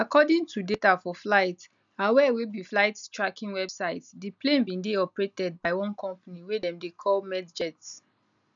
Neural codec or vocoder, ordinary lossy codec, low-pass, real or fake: none; none; 7.2 kHz; real